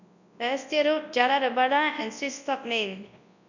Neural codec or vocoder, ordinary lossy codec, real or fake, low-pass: codec, 24 kHz, 0.9 kbps, WavTokenizer, large speech release; none; fake; 7.2 kHz